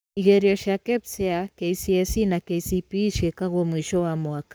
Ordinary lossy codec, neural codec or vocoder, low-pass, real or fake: none; codec, 44.1 kHz, 7.8 kbps, Pupu-Codec; none; fake